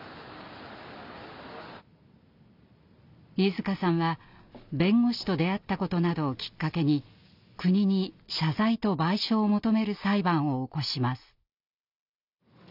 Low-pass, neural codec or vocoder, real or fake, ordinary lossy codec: 5.4 kHz; none; real; none